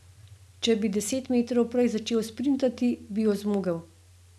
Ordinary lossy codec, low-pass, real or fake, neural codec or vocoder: none; none; real; none